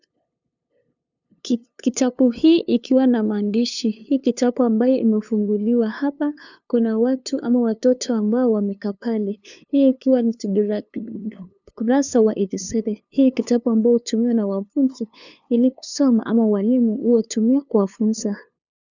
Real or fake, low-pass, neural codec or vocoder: fake; 7.2 kHz; codec, 16 kHz, 2 kbps, FunCodec, trained on LibriTTS, 25 frames a second